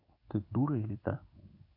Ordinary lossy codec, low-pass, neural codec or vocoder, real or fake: AAC, 48 kbps; 5.4 kHz; codec, 16 kHz in and 24 kHz out, 1 kbps, XY-Tokenizer; fake